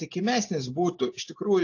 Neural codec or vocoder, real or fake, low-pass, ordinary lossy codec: none; real; 7.2 kHz; AAC, 48 kbps